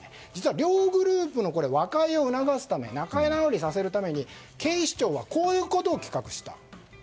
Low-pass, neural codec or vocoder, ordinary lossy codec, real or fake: none; none; none; real